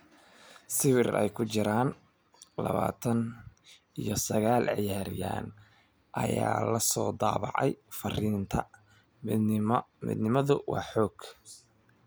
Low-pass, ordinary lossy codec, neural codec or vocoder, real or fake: none; none; none; real